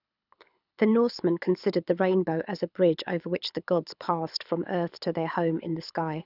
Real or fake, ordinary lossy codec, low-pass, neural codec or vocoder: fake; none; 5.4 kHz; codec, 24 kHz, 6 kbps, HILCodec